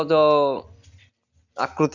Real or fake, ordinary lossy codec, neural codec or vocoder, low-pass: real; none; none; 7.2 kHz